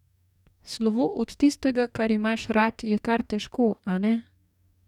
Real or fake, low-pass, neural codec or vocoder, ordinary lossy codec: fake; 19.8 kHz; codec, 44.1 kHz, 2.6 kbps, DAC; none